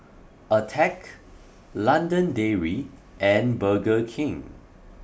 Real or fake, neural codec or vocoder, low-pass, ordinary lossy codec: real; none; none; none